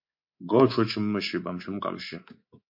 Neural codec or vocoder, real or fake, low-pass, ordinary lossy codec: codec, 24 kHz, 3.1 kbps, DualCodec; fake; 5.4 kHz; MP3, 32 kbps